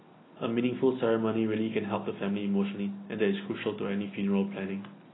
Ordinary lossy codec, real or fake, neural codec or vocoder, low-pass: AAC, 16 kbps; real; none; 7.2 kHz